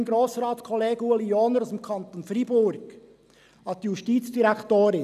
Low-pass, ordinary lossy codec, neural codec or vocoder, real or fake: 14.4 kHz; none; none; real